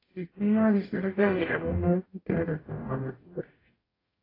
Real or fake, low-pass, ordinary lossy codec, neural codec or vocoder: fake; 5.4 kHz; AAC, 24 kbps; codec, 44.1 kHz, 0.9 kbps, DAC